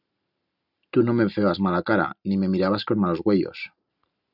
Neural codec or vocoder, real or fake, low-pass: none; real; 5.4 kHz